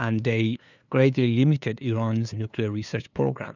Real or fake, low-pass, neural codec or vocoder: fake; 7.2 kHz; codec, 16 kHz, 2 kbps, FunCodec, trained on LibriTTS, 25 frames a second